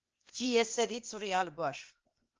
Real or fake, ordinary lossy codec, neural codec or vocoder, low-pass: fake; Opus, 24 kbps; codec, 16 kHz, 0.8 kbps, ZipCodec; 7.2 kHz